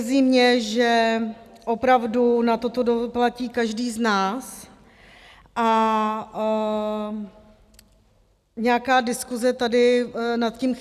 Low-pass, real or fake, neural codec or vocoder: 14.4 kHz; real; none